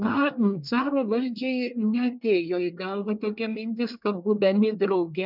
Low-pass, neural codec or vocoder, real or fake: 5.4 kHz; codec, 24 kHz, 1 kbps, SNAC; fake